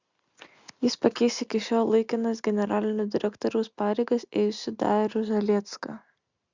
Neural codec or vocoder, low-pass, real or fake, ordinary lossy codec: none; 7.2 kHz; real; Opus, 64 kbps